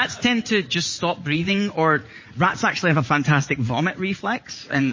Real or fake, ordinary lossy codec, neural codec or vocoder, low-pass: fake; MP3, 32 kbps; vocoder, 44.1 kHz, 80 mel bands, Vocos; 7.2 kHz